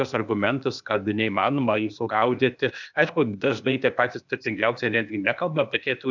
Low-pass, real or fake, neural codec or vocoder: 7.2 kHz; fake; codec, 16 kHz, 0.8 kbps, ZipCodec